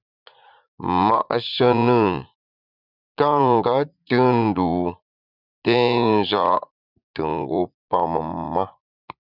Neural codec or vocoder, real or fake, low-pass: vocoder, 44.1 kHz, 80 mel bands, Vocos; fake; 5.4 kHz